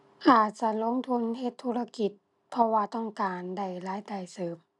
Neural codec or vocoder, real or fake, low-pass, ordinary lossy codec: none; real; 10.8 kHz; none